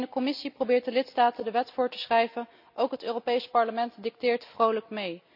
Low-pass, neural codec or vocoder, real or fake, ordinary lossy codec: 5.4 kHz; none; real; none